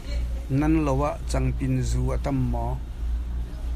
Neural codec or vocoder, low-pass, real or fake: none; 14.4 kHz; real